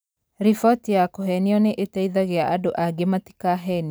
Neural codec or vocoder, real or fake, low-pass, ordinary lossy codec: none; real; none; none